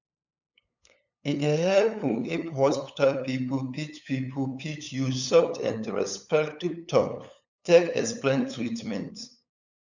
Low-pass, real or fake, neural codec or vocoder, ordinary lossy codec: 7.2 kHz; fake; codec, 16 kHz, 8 kbps, FunCodec, trained on LibriTTS, 25 frames a second; none